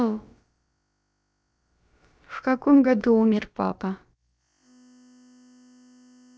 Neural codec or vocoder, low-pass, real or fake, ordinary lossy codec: codec, 16 kHz, about 1 kbps, DyCAST, with the encoder's durations; none; fake; none